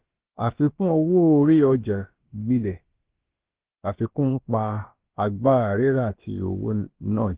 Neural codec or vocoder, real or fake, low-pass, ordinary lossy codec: codec, 16 kHz, about 1 kbps, DyCAST, with the encoder's durations; fake; 3.6 kHz; Opus, 16 kbps